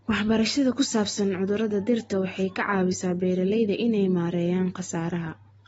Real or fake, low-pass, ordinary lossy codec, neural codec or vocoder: real; 19.8 kHz; AAC, 24 kbps; none